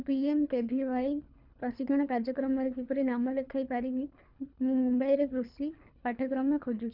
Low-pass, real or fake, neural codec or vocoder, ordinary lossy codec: 5.4 kHz; fake; codec, 24 kHz, 3 kbps, HILCodec; none